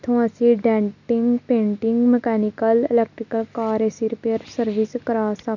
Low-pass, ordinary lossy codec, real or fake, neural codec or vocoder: 7.2 kHz; none; real; none